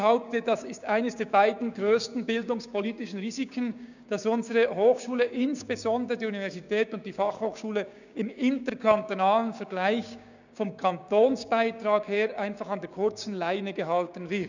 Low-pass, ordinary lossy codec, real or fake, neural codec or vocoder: 7.2 kHz; none; fake; codec, 16 kHz, 6 kbps, DAC